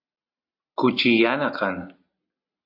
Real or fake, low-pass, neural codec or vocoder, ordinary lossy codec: real; 5.4 kHz; none; AAC, 48 kbps